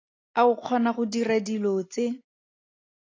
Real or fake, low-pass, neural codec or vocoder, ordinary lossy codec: real; 7.2 kHz; none; AAC, 32 kbps